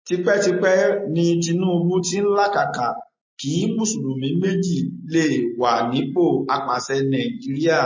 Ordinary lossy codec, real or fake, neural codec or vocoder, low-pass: MP3, 32 kbps; real; none; 7.2 kHz